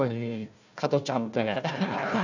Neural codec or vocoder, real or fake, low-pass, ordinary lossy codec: codec, 16 kHz, 1 kbps, FunCodec, trained on Chinese and English, 50 frames a second; fake; 7.2 kHz; none